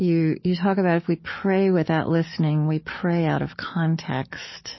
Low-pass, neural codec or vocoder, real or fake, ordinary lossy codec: 7.2 kHz; codec, 44.1 kHz, 7.8 kbps, DAC; fake; MP3, 24 kbps